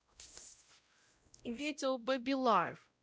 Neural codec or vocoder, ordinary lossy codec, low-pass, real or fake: codec, 16 kHz, 0.5 kbps, X-Codec, WavLM features, trained on Multilingual LibriSpeech; none; none; fake